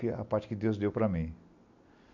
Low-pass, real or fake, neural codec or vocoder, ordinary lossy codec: 7.2 kHz; real; none; none